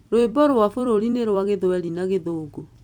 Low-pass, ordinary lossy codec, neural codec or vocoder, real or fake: 19.8 kHz; MP3, 96 kbps; vocoder, 44.1 kHz, 128 mel bands every 256 samples, BigVGAN v2; fake